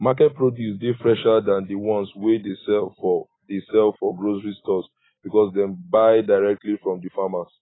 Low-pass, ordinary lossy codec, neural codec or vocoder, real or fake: 7.2 kHz; AAC, 16 kbps; none; real